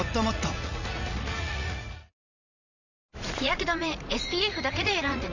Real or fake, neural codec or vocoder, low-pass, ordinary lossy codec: fake; vocoder, 44.1 kHz, 80 mel bands, Vocos; 7.2 kHz; none